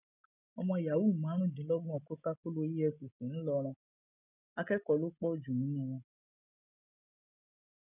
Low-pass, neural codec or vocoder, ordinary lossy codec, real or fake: 3.6 kHz; none; none; real